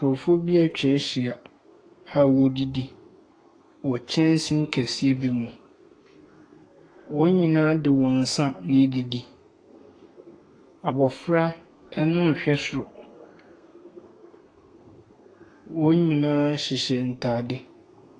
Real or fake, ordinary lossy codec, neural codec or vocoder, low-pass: fake; AAC, 48 kbps; codec, 32 kHz, 1.9 kbps, SNAC; 9.9 kHz